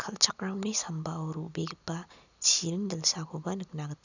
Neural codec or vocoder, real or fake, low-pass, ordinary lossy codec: none; real; 7.2 kHz; none